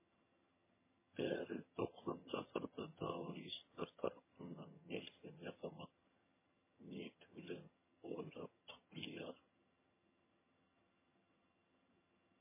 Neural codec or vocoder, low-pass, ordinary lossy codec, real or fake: vocoder, 22.05 kHz, 80 mel bands, HiFi-GAN; 3.6 kHz; MP3, 16 kbps; fake